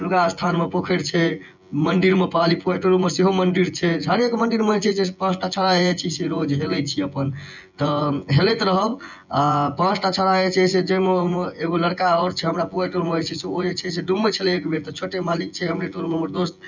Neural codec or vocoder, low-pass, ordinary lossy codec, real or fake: vocoder, 24 kHz, 100 mel bands, Vocos; 7.2 kHz; none; fake